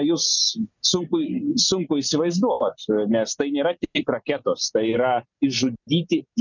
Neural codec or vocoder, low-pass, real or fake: none; 7.2 kHz; real